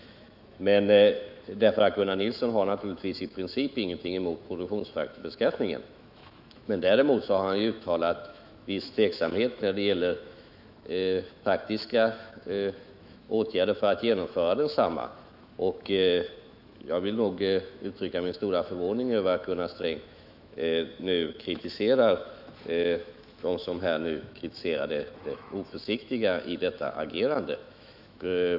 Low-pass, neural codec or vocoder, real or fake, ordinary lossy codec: 5.4 kHz; autoencoder, 48 kHz, 128 numbers a frame, DAC-VAE, trained on Japanese speech; fake; none